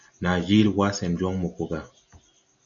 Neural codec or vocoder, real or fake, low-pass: none; real; 7.2 kHz